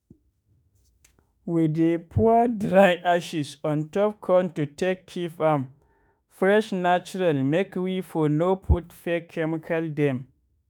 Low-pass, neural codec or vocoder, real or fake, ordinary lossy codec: none; autoencoder, 48 kHz, 32 numbers a frame, DAC-VAE, trained on Japanese speech; fake; none